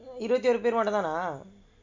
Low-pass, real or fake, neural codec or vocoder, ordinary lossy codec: 7.2 kHz; real; none; MP3, 64 kbps